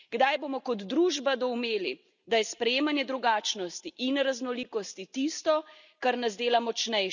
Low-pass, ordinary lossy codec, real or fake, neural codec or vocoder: 7.2 kHz; none; real; none